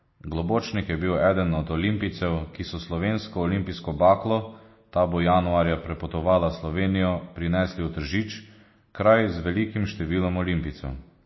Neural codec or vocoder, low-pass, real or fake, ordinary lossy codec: none; 7.2 kHz; real; MP3, 24 kbps